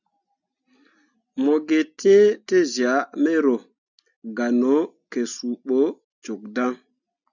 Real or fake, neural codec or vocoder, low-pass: real; none; 7.2 kHz